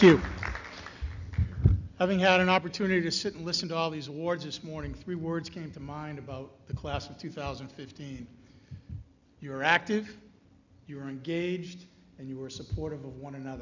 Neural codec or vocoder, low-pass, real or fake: none; 7.2 kHz; real